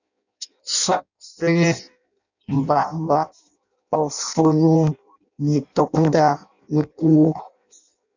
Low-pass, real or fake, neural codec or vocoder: 7.2 kHz; fake; codec, 16 kHz in and 24 kHz out, 0.6 kbps, FireRedTTS-2 codec